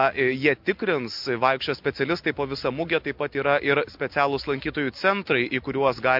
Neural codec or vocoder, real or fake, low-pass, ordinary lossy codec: none; real; 5.4 kHz; MP3, 48 kbps